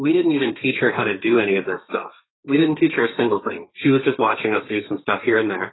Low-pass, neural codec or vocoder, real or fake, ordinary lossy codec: 7.2 kHz; codec, 32 kHz, 1.9 kbps, SNAC; fake; AAC, 16 kbps